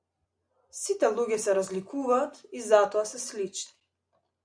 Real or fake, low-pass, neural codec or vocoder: real; 9.9 kHz; none